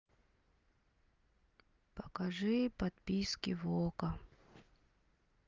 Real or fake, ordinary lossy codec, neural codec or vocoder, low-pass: real; Opus, 24 kbps; none; 7.2 kHz